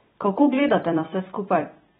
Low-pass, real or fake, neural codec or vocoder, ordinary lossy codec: 19.8 kHz; fake; vocoder, 44.1 kHz, 128 mel bands every 256 samples, BigVGAN v2; AAC, 16 kbps